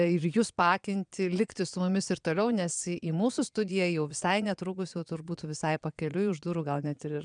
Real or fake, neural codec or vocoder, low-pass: fake; vocoder, 22.05 kHz, 80 mel bands, Vocos; 9.9 kHz